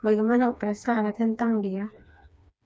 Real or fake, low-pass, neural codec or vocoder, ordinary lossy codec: fake; none; codec, 16 kHz, 2 kbps, FreqCodec, smaller model; none